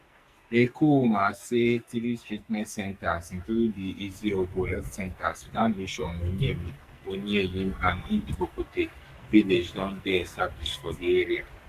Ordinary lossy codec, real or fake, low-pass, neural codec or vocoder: Opus, 64 kbps; fake; 14.4 kHz; codec, 44.1 kHz, 2.6 kbps, SNAC